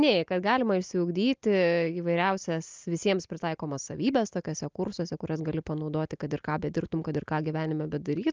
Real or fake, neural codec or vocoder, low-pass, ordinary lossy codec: real; none; 7.2 kHz; Opus, 24 kbps